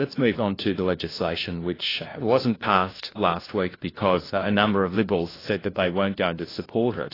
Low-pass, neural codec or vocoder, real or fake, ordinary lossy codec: 5.4 kHz; codec, 16 kHz, 1 kbps, FunCodec, trained on LibriTTS, 50 frames a second; fake; AAC, 24 kbps